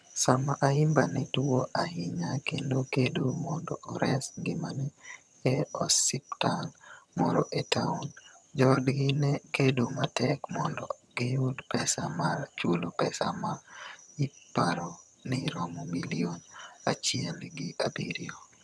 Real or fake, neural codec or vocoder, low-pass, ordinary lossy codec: fake; vocoder, 22.05 kHz, 80 mel bands, HiFi-GAN; none; none